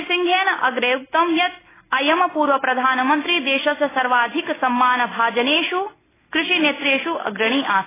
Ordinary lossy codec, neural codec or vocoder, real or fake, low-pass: AAC, 16 kbps; none; real; 3.6 kHz